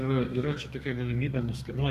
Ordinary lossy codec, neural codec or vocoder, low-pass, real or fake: Opus, 64 kbps; codec, 32 kHz, 1.9 kbps, SNAC; 14.4 kHz; fake